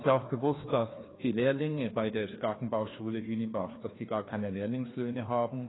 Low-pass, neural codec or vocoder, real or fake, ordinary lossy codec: 7.2 kHz; codec, 16 kHz, 2 kbps, FreqCodec, larger model; fake; AAC, 16 kbps